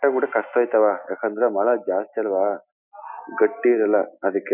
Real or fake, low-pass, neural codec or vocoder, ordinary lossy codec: real; 3.6 kHz; none; none